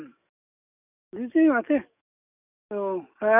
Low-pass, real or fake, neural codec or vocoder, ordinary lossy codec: 3.6 kHz; fake; codec, 44.1 kHz, 7.8 kbps, DAC; none